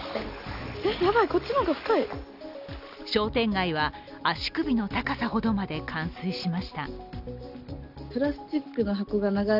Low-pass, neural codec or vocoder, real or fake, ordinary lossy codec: 5.4 kHz; none; real; none